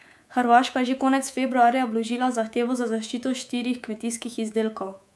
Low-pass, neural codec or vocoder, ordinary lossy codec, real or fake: none; codec, 24 kHz, 3.1 kbps, DualCodec; none; fake